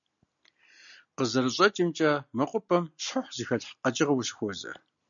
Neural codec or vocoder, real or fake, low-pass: none; real; 7.2 kHz